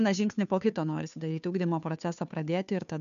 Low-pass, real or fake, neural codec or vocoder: 7.2 kHz; fake; codec, 16 kHz, 2 kbps, FunCodec, trained on Chinese and English, 25 frames a second